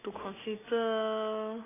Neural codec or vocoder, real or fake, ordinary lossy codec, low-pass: none; real; AAC, 16 kbps; 3.6 kHz